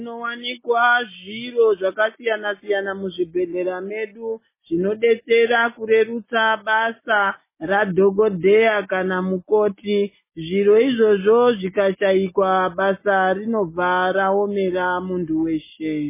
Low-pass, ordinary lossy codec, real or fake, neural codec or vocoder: 3.6 kHz; MP3, 16 kbps; real; none